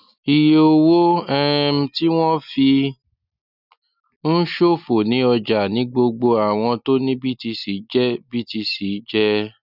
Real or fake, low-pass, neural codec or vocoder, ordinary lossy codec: real; 5.4 kHz; none; none